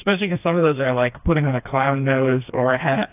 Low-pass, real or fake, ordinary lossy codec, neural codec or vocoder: 3.6 kHz; fake; AAC, 32 kbps; codec, 16 kHz, 2 kbps, FreqCodec, smaller model